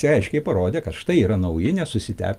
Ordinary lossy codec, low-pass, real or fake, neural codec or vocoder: Opus, 32 kbps; 14.4 kHz; real; none